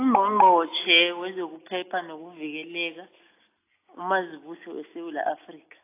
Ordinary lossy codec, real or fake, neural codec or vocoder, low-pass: AAC, 24 kbps; real; none; 3.6 kHz